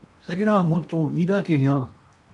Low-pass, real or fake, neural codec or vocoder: 10.8 kHz; fake; codec, 16 kHz in and 24 kHz out, 0.8 kbps, FocalCodec, streaming, 65536 codes